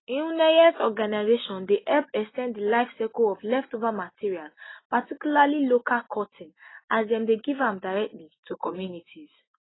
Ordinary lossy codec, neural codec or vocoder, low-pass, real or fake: AAC, 16 kbps; none; 7.2 kHz; real